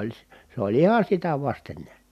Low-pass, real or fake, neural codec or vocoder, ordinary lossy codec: 14.4 kHz; real; none; none